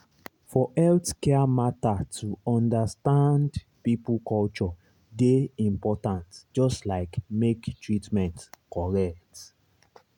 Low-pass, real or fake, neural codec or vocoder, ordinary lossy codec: 19.8 kHz; real; none; none